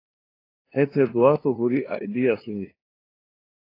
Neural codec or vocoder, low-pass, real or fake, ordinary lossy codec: codec, 16 kHz, 2 kbps, FunCodec, trained on LibriTTS, 25 frames a second; 5.4 kHz; fake; AAC, 24 kbps